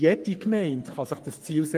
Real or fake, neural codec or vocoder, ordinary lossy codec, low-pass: fake; codec, 44.1 kHz, 3.4 kbps, Pupu-Codec; Opus, 32 kbps; 14.4 kHz